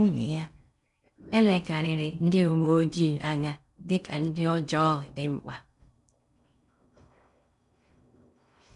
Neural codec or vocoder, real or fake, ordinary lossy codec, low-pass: codec, 16 kHz in and 24 kHz out, 0.6 kbps, FocalCodec, streaming, 2048 codes; fake; none; 10.8 kHz